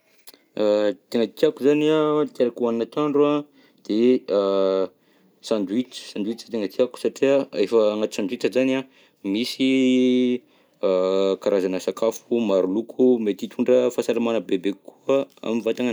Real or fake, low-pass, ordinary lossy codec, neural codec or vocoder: real; none; none; none